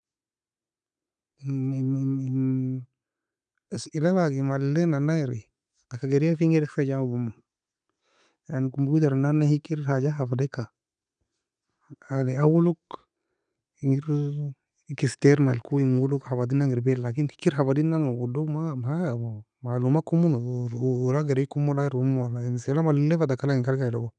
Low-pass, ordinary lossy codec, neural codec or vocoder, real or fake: 9.9 kHz; none; none; real